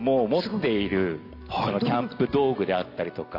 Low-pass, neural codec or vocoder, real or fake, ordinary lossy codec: 5.4 kHz; none; real; none